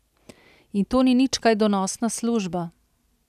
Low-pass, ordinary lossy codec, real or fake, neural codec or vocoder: 14.4 kHz; none; real; none